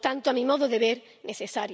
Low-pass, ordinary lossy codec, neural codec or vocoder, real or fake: none; none; none; real